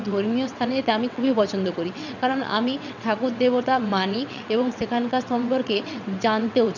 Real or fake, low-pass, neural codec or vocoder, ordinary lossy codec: fake; 7.2 kHz; vocoder, 22.05 kHz, 80 mel bands, WaveNeXt; none